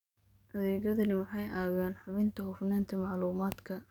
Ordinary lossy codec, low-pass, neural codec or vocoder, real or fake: none; 19.8 kHz; codec, 44.1 kHz, 7.8 kbps, DAC; fake